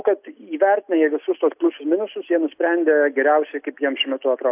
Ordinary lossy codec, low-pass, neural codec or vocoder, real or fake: AAC, 32 kbps; 3.6 kHz; none; real